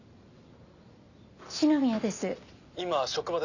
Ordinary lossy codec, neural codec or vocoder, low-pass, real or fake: none; none; 7.2 kHz; real